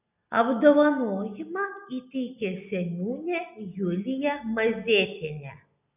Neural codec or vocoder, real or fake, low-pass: none; real; 3.6 kHz